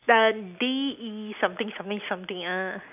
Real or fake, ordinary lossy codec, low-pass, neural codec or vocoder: real; AAC, 32 kbps; 3.6 kHz; none